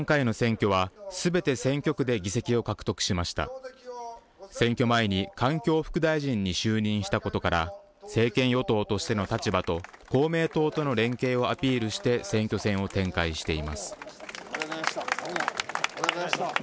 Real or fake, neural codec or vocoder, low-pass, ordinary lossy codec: real; none; none; none